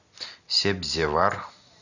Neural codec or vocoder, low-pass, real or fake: none; 7.2 kHz; real